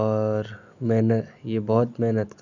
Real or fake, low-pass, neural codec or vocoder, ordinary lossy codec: real; 7.2 kHz; none; none